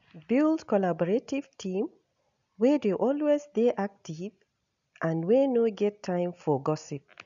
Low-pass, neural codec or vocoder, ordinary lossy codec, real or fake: 7.2 kHz; none; none; real